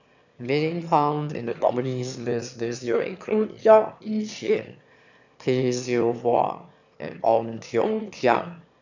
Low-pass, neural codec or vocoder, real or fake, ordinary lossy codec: 7.2 kHz; autoencoder, 22.05 kHz, a latent of 192 numbers a frame, VITS, trained on one speaker; fake; none